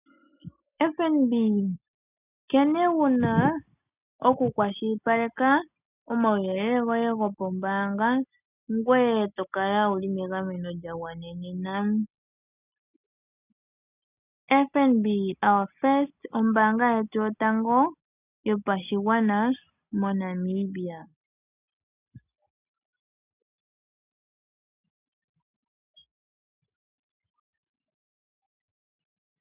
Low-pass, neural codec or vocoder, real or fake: 3.6 kHz; none; real